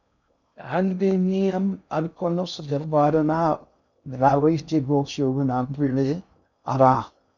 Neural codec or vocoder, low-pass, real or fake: codec, 16 kHz in and 24 kHz out, 0.6 kbps, FocalCodec, streaming, 2048 codes; 7.2 kHz; fake